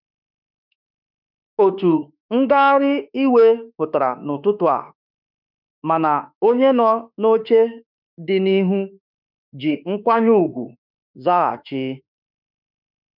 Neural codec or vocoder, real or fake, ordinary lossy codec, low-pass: autoencoder, 48 kHz, 32 numbers a frame, DAC-VAE, trained on Japanese speech; fake; none; 5.4 kHz